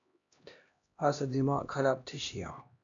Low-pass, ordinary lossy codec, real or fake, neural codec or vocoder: 7.2 kHz; AAC, 64 kbps; fake; codec, 16 kHz, 1 kbps, X-Codec, HuBERT features, trained on LibriSpeech